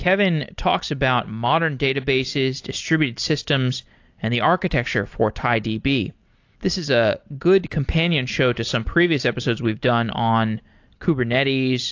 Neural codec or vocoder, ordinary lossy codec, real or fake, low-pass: none; AAC, 48 kbps; real; 7.2 kHz